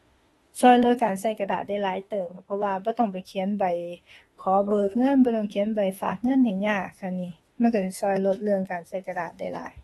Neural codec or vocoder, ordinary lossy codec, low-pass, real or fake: autoencoder, 48 kHz, 32 numbers a frame, DAC-VAE, trained on Japanese speech; AAC, 32 kbps; 19.8 kHz; fake